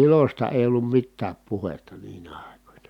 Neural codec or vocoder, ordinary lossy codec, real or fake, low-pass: none; none; real; 19.8 kHz